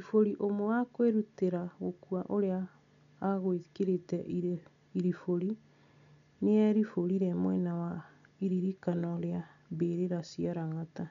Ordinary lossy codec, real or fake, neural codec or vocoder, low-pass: MP3, 96 kbps; real; none; 7.2 kHz